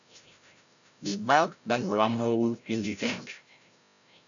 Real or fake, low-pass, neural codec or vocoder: fake; 7.2 kHz; codec, 16 kHz, 0.5 kbps, FreqCodec, larger model